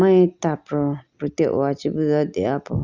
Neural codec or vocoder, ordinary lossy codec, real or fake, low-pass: none; Opus, 64 kbps; real; 7.2 kHz